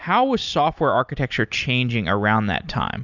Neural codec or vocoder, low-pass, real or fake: none; 7.2 kHz; real